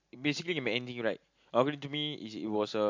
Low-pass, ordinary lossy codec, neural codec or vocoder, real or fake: 7.2 kHz; MP3, 48 kbps; none; real